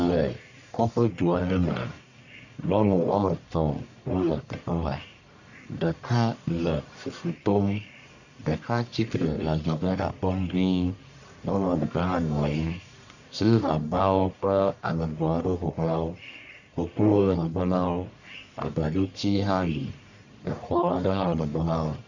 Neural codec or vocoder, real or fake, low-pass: codec, 44.1 kHz, 1.7 kbps, Pupu-Codec; fake; 7.2 kHz